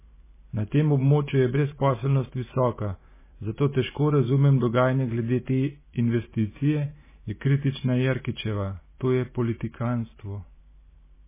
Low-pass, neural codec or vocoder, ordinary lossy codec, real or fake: 3.6 kHz; vocoder, 44.1 kHz, 128 mel bands every 512 samples, BigVGAN v2; MP3, 16 kbps; fake